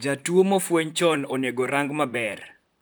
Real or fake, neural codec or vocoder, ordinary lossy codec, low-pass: fake; vocoder, 44.1 kHz, 128 mel bands, Pupu-Vocoder; none; none